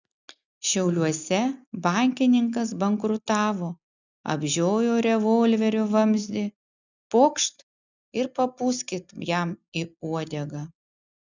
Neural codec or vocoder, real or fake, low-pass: none; real; 7.2 kHz